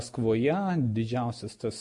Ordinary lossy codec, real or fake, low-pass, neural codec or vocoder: MP3, 48 kbps; real; 10.8 kHz; none